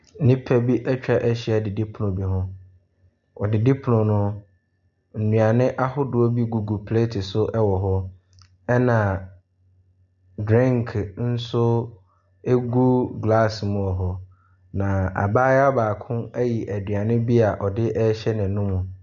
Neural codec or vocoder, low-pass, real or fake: none; 7.2 kHz; real